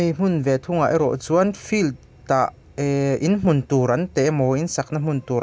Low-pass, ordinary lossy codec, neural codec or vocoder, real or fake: none; none; none; real